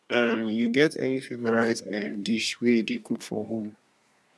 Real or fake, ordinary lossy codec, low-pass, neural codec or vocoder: fake; none; none; codec, 24 kHz, 1 kbps, SNAC